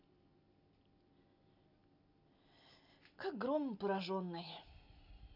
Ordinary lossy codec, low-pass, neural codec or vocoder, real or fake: none; 5.4 kHz; none; real